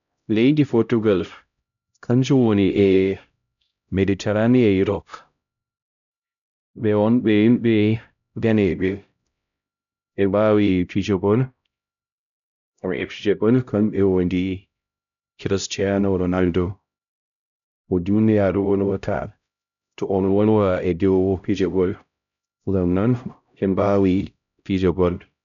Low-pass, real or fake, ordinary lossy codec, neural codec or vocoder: 7.2 kHz; fake; none; codec, 16 kHz, 0.5 kbps, X-Codec, HuBERT features, trained on LibriSpeech